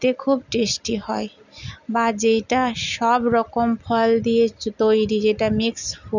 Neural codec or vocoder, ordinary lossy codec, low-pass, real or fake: none; none; 7.2 kHz; real